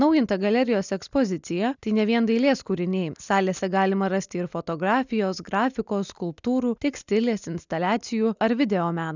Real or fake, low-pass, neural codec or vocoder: real; 7.2 kHz; none